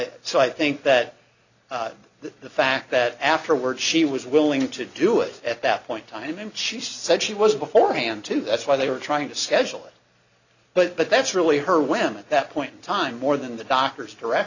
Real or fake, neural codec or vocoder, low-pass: real; none; 7.2 kHz